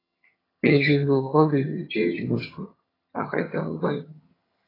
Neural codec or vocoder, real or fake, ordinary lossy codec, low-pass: vocoder, 22.05 kHz, 80 mel bands, HiFi-GAN; fake; AAC, 24 kbps; 5.4 kHz